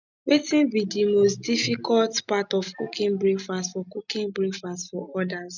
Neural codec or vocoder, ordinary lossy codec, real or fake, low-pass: none; none; real; 7.2 kHz